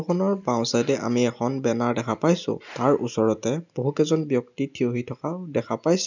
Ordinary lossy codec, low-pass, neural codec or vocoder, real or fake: none; 7.2 kHz; none; real